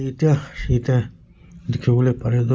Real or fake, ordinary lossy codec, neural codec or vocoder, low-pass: real; none; none; none